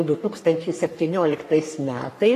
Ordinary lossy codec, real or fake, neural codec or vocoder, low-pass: AAC, 48 kbps; fake; codec, 44.1 kHz, 3.4 kbps, Pupu-Codec; 14.4 kHz